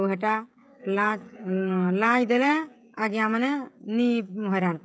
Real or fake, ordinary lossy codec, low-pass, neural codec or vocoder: fake; none; none; codec, 16 kHz, 16 kbps, FreqCodec, smaller model